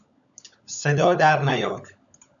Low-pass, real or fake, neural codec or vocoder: 7.2 kHz; fake; codec, 16 kHz, 16 kbps, FunCodec, trained on LibriTTS, 50 frames a second